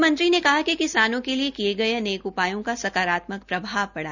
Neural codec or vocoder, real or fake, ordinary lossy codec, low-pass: none; real; none; 7.2 kHz